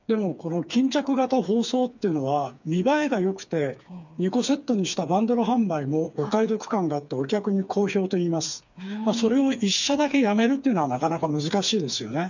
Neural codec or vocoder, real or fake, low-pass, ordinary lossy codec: codec, 16 kHz, 4 kbps, FreqCodec, smaller model; fake; 7.2 kHz; none